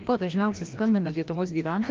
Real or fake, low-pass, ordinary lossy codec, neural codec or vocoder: fake; 7.2 kHz; Opus, 32 kbps; codec, 16 kHz, 1 kbps, FreqCodec, larger model